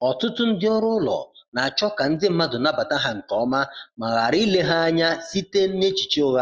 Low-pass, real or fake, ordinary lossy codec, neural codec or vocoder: 7.2 kHz; real; Opus, 24 kbps; none